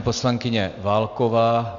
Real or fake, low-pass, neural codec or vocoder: real; 7.2 kHz; none